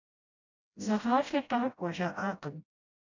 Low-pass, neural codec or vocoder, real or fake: 7.2 kHz; codec, 16 kHz, 0.5 kbps, FreqCodec, smaller model; fake